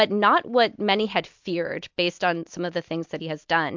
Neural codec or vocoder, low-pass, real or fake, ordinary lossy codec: none; 7.2 kHz; real; MP3, 64 kbps